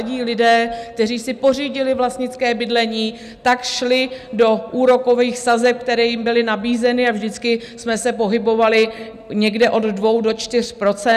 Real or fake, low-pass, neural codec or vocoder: real; 14.4 kHz; none